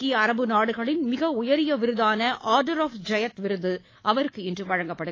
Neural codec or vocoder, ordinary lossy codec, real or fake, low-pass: codec, 24 kHz, 3.1 kbps, DualCodec; AAC, 32 kbps; fake; 7.2 kHz